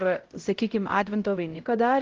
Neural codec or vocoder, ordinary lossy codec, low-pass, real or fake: codec, 16 kHz, 0.5 kbps, X-Codec, HuBERT features, trained on LibriSpeech; Opus, 16 kbps; 7.2 kHz; fake